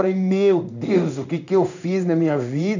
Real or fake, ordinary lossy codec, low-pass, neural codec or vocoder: fake; none; 7.2 kHz; codec, 16 kHz in and 24 kHz out, 1 kbps, XY-Tokenizer